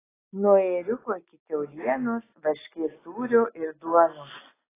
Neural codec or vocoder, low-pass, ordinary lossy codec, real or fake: none; 3.6 kHz; AAC, 16 kbps; real